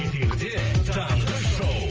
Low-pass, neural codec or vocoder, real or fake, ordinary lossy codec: 7.2 kHz; none; real; Opus, 24 kbps